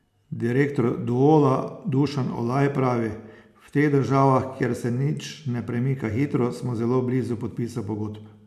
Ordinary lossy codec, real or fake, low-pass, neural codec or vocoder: MP3, 96 kbps; real; 14.4 kHz; none